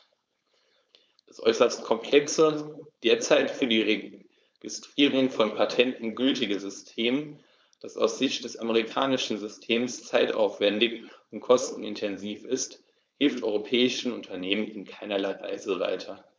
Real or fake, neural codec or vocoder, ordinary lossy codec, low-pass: fake; codec, 16 kHz, 4.8 kbps, FACodec; none; none